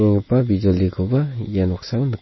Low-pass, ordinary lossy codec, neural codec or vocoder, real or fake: 7.2 kHz; MP3, 24 kbps; none; real